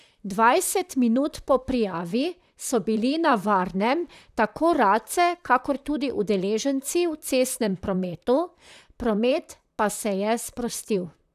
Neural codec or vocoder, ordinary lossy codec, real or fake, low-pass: vocoder, 44.1 kHz, 128 mel bands, Pupu-Vocoder; none; fake; 14.4 kHz